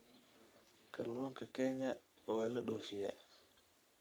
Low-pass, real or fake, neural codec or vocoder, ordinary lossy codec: none; fake; codec, 44.1 kHz, 3.4 kbps, Pupu-Codec; none